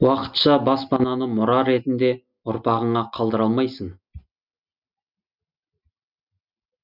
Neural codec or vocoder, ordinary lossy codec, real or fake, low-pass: none; none; real; 5.4 kHz